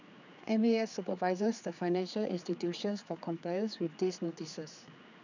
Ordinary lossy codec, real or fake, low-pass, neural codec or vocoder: none; fake; 7.2 kHz; codec, 16 kHz, 4 kbps, X-Codec, HuBERT features, trained on general audio